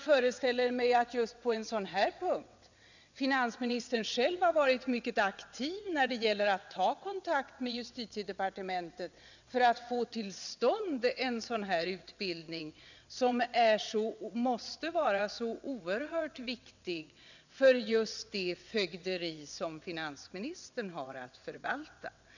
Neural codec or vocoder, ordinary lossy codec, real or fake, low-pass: vocoder, 22.05 kHz, 80 mel bands, WaveNeXt; none; fake; 7.2 kHz